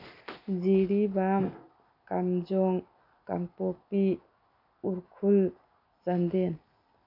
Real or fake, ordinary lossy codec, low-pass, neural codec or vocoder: real; none; 5.4 kHz; none